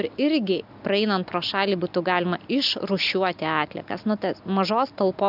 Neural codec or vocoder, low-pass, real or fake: none; 5.4 kHz; real